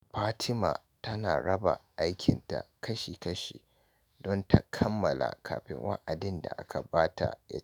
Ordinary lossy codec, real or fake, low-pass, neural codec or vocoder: none; fake; none; autoencoder, 48 kHz, 128 numbers a frame, DAC-VAE, trained on Japanese speech